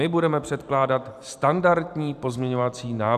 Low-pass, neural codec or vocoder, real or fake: 14.4 kHz; vocoder, 44.1 kHz, 128 mel bands every 512 samples, BigVGAN v2; fake